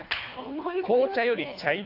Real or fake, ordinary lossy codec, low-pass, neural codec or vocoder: fake; AAC, 32 kbps; 5.4 kHz; codec, 24 kHz, 3 kbps, HILCodec